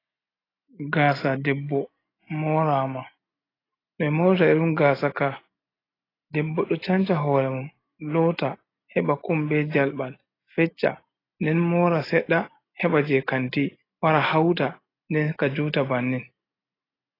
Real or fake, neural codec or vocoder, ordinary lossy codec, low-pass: real; none; AAC, 24 kbps; 5.4 kHz